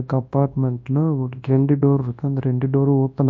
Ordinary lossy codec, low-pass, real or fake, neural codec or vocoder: MP3, 48 kbps; 7.2 kHz; fake; codec, 24 kHz, 0.9 kbps, WavTokenizer, large speech release